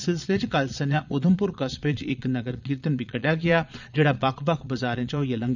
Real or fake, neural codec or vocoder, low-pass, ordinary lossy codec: fake; vocoder, 22.05 kHz, 80 mel bands, Vocos; 7.2 kHz; none